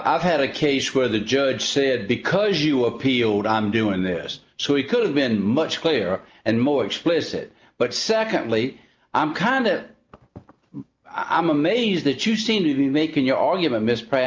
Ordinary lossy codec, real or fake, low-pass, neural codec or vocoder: Opus, 24 kbps; real; 7.2 kHz; none